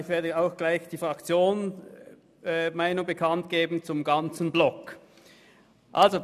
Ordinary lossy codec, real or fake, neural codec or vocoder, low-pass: none; real; none; 14.4 kHz